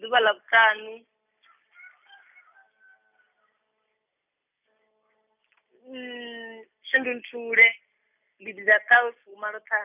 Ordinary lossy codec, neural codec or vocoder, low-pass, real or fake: none; none; 3.6 kHz; real